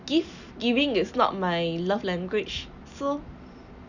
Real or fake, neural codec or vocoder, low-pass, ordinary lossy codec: real; none; 7.2 kHz; none